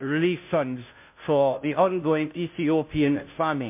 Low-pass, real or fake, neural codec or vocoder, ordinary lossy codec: 3.6 kHz; fake; codec, 16 kHz, 0.5 kbps, FunCodec, trained on Chinese and English, 25 frames a second; MP3, 32 kbps